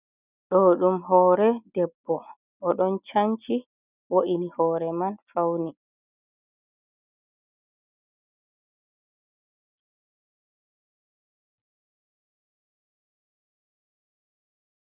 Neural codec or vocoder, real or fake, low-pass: none; real; 3.6 kHz